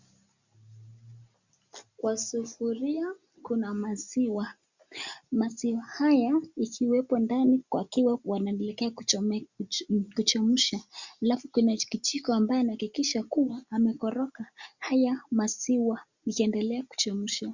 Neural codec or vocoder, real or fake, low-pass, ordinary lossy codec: none; real; 7.2 kHz; Opus, 64 kbps